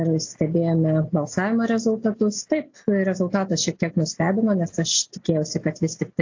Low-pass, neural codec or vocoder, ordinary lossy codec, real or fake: 7.2 kHz; none; AAC, 48 kbps; real